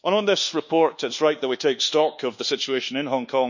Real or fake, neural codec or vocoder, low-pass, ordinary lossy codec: fake; codec, 24 kHz, 1.2 kbps, DualCodec; 7.2 kHz; none